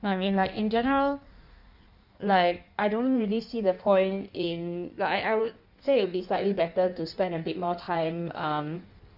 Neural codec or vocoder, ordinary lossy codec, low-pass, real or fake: codec, 16 kHz in and 24 kHz out, 1.1 kbps, FireRedTTS-2 codec; none; 5.4 kHz; fake